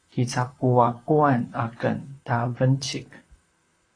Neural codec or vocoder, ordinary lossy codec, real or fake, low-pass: vocoder, 44.1 kHz, 128 mel bands, Pupu-Vocoder; AAC, 32 kbps; fake; 9.9 kHz